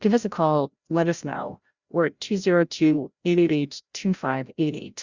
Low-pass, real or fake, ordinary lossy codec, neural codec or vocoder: 7.2 kHz; fake; Opus, 64 kbps; codec, 16 kHz, 0.5 kbps, FreqCodec, larger model